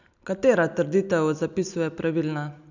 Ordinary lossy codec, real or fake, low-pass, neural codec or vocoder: none; real; 7.2 kHz; none